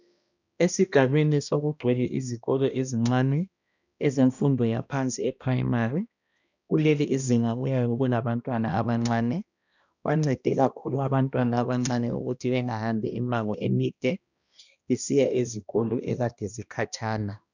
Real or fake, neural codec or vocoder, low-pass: fake; codec, 16 kHz, 1 kbps, X-Codec, HuBERT features, trained on balanced general audio; 7.2 kHz